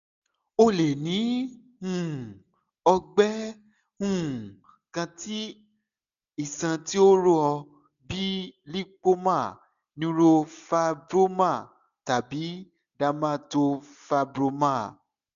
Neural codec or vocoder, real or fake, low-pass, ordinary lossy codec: none; real; 7.2 kHz; none